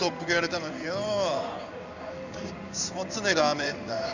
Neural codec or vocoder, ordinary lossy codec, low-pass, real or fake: codec, 16 kHz in and 24 kHz out, 1 kbps, XY-Tokenizer; none; 7.2 kHz; fake